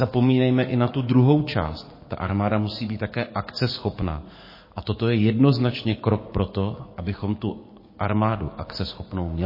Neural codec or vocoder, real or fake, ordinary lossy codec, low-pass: codec, 44.1 kHz, 7.8 kbps, DAC; fake; MP3, 24 kbps; 5.4 kHz